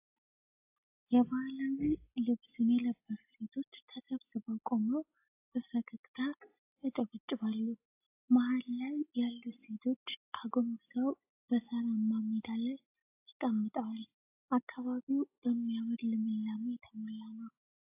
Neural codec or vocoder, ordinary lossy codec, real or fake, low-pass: none; AAC, 32 kbps; real; 3.6 kHz